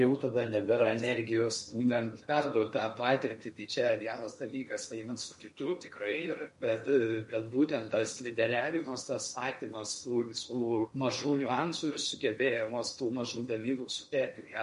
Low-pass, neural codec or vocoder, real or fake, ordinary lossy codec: 10.8 kHz; codec, 16 kHz in and 24 kHz out, 0.8 kbps, FocalCodec, streaming, 65536 codes; fake; MP3, 48 kbps